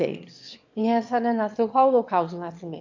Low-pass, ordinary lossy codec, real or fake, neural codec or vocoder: 7.2 kHz; AAC, 48 kbps; fake; autoencoder, 22.05 kHz, a latent of 192 numbers a frame, VITS, trained on one speaker